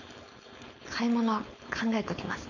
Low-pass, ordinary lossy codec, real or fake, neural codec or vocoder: 7.2 kHz; none; fake; codec, 16 kHz, 4.8 kbps, FACodec